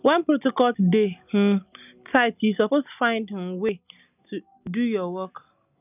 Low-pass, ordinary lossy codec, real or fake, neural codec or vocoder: 3.6 kHz; none; real; none